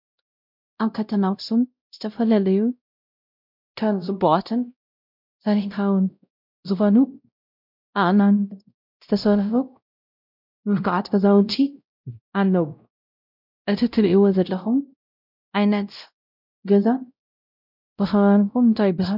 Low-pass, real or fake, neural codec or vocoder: 5.4 kHz; fake; codec, 16 kHz, 0.5 kbps, X-Codec, WavLM features, trained on Multilingual LibriSpeech